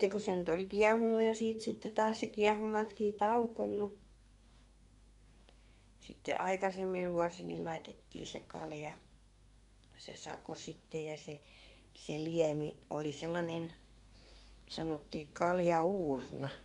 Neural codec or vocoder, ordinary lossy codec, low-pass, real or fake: codec, 24 kHz, 1 kbps, SNAC; none; 10.8 kHz; fake